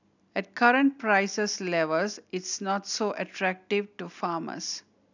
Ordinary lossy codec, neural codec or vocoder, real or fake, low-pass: none; none; real; 7.2 kHz